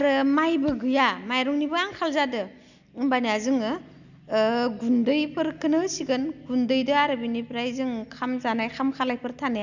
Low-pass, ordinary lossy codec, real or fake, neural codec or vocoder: 7.2 kHz; none; real; none